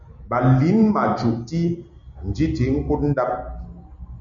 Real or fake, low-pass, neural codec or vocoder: real; 7.2 kHz; none